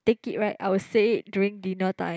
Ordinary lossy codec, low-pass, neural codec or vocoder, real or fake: none; none; none; real